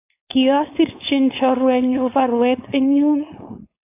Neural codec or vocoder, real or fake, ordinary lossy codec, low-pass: codec, 16 kHz, 4.8 kbps, FACodec; fake; AAC, 32 kbps; 3.6 kHz